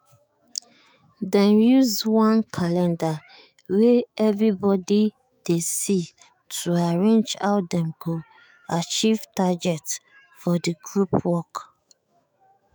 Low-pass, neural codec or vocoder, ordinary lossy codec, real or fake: none; autoencoder, 48 kHz, 128 numbers a frame, DAC-VAE, trained on Japanese speech; none; fake